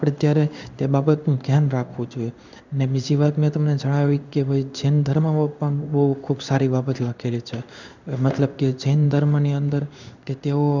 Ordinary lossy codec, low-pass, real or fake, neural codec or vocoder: none; 7.2 kHz; fake; codec, 16 kHz in and 24 kHz out, 1 kbps, XY-Tokenizer